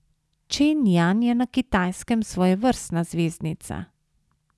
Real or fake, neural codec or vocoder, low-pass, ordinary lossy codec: real; none; none; none